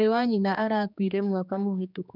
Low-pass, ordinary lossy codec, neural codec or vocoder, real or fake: 5.4 kHz; none; codec, 16 kHz, 2 kbps, X-Codec, HuBERT features, trained on general audio; fake